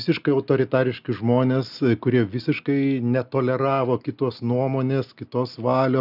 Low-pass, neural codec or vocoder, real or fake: 5.4 kHz; none; real